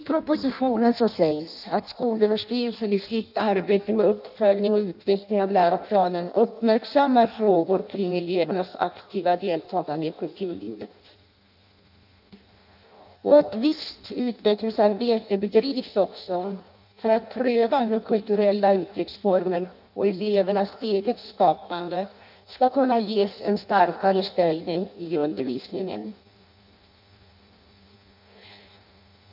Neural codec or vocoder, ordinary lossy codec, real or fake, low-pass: codec, 16 kHz in and 24 kHz out, 0.6 kbps, FireRedTTS-2 codec; none; fake; 5.4 kHz